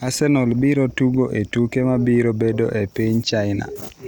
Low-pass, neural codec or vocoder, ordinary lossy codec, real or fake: none; none; none; real